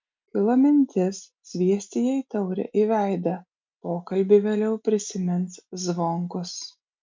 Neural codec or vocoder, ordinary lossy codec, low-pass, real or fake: none; MP3, 64 kbps; 7.2 kHz; real